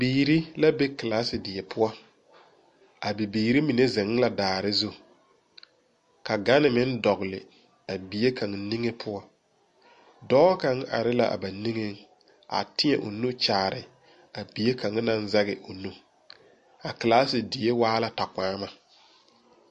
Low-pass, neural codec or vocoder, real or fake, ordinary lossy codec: 14.4 kHz; none; real; MP3, 48 kbps